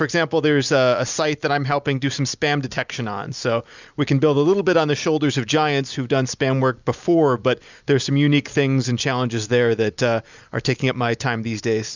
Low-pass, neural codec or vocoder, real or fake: 7.2 kHz; none; real